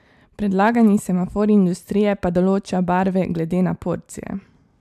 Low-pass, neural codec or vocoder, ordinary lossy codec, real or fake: 14.4 kHz; none; AAC, 96 kbps; real